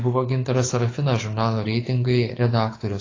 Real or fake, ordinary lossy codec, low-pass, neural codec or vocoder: fake; AAC, 32 kbps; 7.2 kHz; codec, 24 kHz, 6 kbps, HILCodec